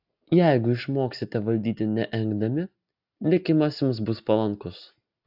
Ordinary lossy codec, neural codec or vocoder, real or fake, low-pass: AAC, 48 kbps; none; real; 5.4 kHz